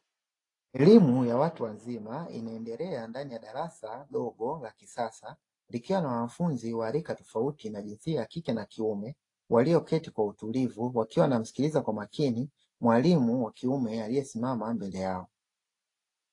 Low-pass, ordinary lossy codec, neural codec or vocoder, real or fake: 10.8 kHz; AAC, 48 kbps; none; real